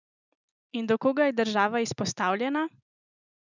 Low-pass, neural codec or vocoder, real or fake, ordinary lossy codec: 7.2 kHz; none; real; none